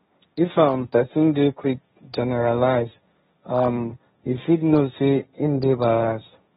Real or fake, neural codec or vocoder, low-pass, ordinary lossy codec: fake; codec, 16 kHz, 1.1 kbps, Voila-Tokenizer; 7.2 kHz; AAC, 16 kbps